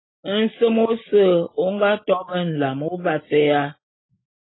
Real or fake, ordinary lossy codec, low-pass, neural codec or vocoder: real; AAC, 16 kbps; 7.2 kHz; none